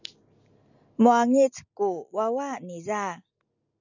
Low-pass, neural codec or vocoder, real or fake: 7.2 kHz; none; real